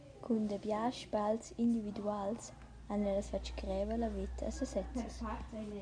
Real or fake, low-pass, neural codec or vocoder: real; 9.9 kHz; none